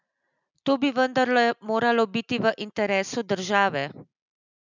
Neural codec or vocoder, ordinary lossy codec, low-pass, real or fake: none; none; 7.2 kHz; real